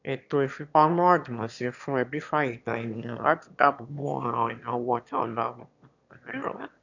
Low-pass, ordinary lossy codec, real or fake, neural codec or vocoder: 7.2 kHz; none; fake; autoencoder, 22.05 kHz, a latent of 192 numbers a frame, VITS, trained on one speaker